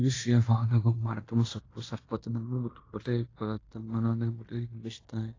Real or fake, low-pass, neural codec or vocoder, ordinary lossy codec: fake; 7.2 kHz; codec, 16 kHz in and 24 kHz out, 0.9 kbps, LongCat-Audio-Codec, fine tuned four codebook decoder; AAC, 32 kbps